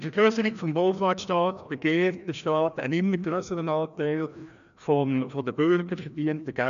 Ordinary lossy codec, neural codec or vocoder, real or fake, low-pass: none; codec, 16 kHz, 1 kbps, FreqCodec, larger model; fake; 7.2 kHz